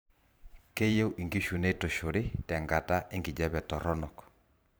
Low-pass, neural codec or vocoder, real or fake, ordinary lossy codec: none; vocoder, 44.1 kHz, 128 mel bands every 512 samples, BigVGAN v2; fake; none